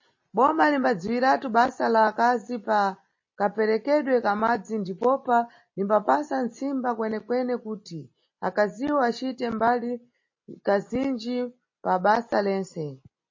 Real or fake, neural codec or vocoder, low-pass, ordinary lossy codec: real; none; 7.2 kHz; MP3, 32 kbps